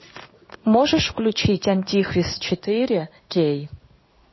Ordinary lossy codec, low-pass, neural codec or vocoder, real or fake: MP3, 24 kbps; 7.2 kHz; codec, 16 kHz in and 24 kHz out, 1 kbps, XY-Tokenizer; fake